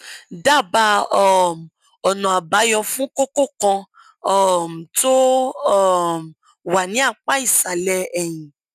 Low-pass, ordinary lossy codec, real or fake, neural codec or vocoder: 14.4 kHz; none; real; none